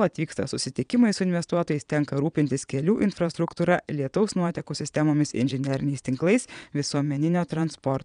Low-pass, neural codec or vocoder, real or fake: 9.9 kHz; vocoder, 22.05 kHz, 80 mel bands, Vocos; fake